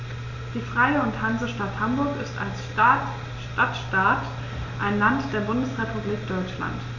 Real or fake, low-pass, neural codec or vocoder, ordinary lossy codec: real; 7.2 kHz; none; none